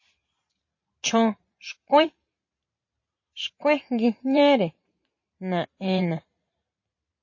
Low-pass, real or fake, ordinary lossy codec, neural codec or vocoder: 7.2 kHz; fake; MP3, 32 kbps; vocoder, 44.1 kHz, 128 mel bands every 512 samples, BigVGAN v2